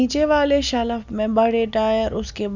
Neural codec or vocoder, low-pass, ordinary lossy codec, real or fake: none; 7.2 kHz; none; real